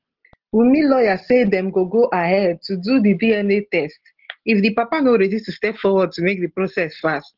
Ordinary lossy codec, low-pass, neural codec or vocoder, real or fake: Opus, 16 kbps; 5.4 kHz; none; real